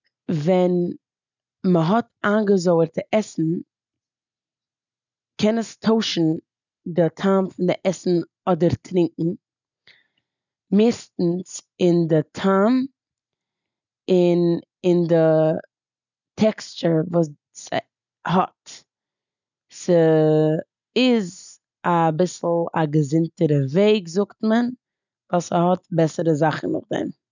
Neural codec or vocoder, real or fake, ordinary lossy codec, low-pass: none; real; none; 7.2 kHz